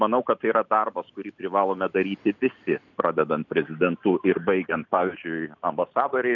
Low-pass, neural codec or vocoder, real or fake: 7.2 kHz; none; real